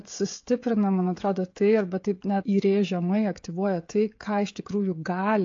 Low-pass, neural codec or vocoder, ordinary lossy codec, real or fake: 7.2 kHz; codec, 16 kHz, 16 kbps, FreqCodec, smaller model; AAC, 48 kbps; fake